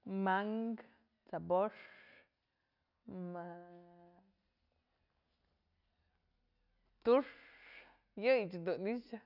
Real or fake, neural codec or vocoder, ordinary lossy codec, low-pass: real; none; none; 5.4 kHz